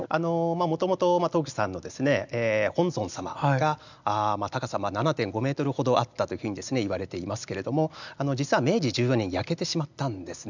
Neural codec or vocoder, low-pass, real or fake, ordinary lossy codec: none; 7.2 kHz; real; none